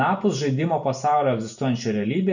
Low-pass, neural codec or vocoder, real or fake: 7.2 kHz; none; real